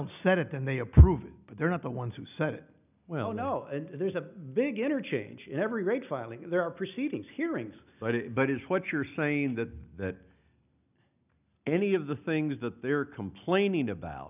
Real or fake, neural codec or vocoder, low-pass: real; none; 3.6 kHz